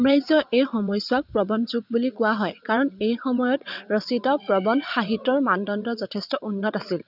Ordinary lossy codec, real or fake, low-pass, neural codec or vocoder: none; fake; 5.4 kHz; vocoder, 22.05 kHz, 80 mel bands, WaveNeXt